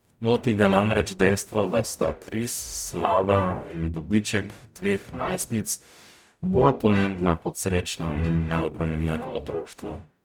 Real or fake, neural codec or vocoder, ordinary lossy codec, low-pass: fake; codec, 44.1 kHz, 0.9 kbps, DAC; none; 19.8 kHz